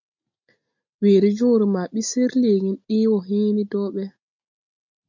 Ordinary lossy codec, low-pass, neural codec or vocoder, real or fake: MP3, 48 kbps; 7.2 kHz; none; real